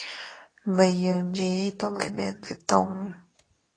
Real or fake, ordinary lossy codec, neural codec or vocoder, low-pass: fake; AAC, 32 kbps; codec, 24 kHz, 0.9 kbps, WavTokenizer, medium speech release version 2; 9.9 kHz